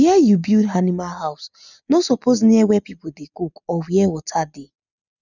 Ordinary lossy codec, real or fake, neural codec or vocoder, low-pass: none; real; none; 7.2 kHz